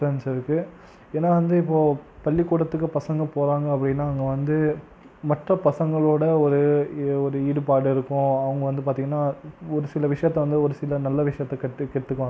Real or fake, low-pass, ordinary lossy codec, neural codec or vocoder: real; none; none; none